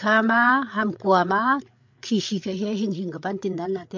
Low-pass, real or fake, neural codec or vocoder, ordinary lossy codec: 7.2 kHz; fake; codec, 16 kHz, 8 kbps, FreqCodec, larger model; MP3, 48 kbps